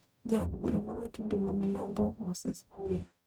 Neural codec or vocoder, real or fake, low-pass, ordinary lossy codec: codec, 44.1 kHz, 0.9 kbps, DAC; fake; none; none